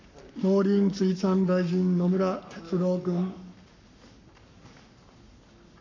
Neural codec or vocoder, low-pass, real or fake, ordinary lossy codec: codec, 44.1 kHz, 7.8 kbps, Pupu-Codec; 7.2 kHz; fake; none